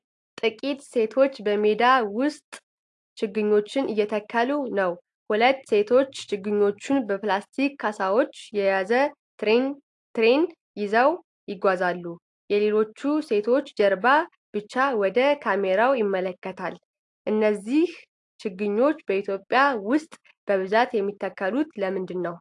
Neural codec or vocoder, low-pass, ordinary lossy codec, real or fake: none; 10.8 kHz; AAC, 64 kbps; real